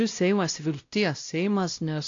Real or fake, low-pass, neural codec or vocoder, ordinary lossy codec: fake; 7.2 kHz; codec, 16 kHz, 0.5 kbps, X-Codec, WavLM features, trained on Multilingual LibriSpeech; AAC, 48 kbps